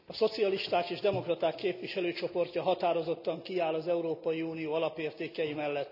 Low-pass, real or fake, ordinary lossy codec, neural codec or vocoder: 5.4 kHz; real; none; none